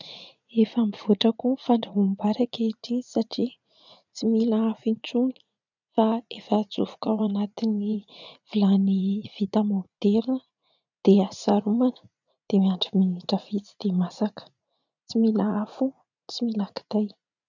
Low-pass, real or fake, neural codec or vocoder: 7.2 kHz; fake; vocoder, 44.1 kHz, 80 mel bands, Vocos